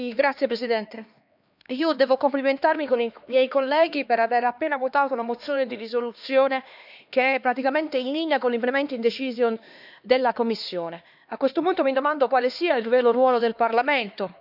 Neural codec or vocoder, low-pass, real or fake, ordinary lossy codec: codec, 16 kHz, 2 kbps, X-Codec, HuBERT features, trained on LibriSpeech; 5.4 kHz; fake; none